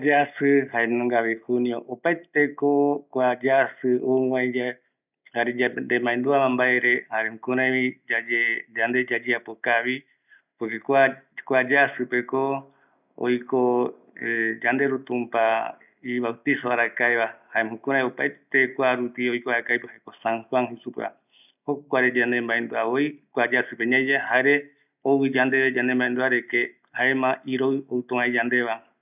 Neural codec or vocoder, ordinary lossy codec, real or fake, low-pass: none; none; real; 3.6 kHz